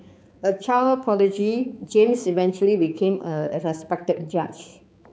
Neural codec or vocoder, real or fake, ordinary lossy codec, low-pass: codec, 16 kHz, 4 kbps, X-Codec, HuBERT features, trained on balanced general audio; fake; none; none